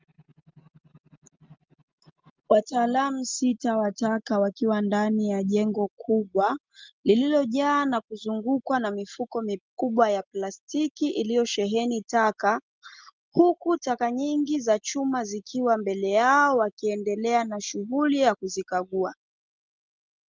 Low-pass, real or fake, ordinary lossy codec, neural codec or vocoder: 7.2 kHz; real; Opus, 24 kbps; none